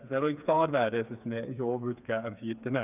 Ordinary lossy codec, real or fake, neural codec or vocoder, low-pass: none; fake; codec, 16 kHz, 4 kbps, FreqCodec, smaller model; 3.6 kHz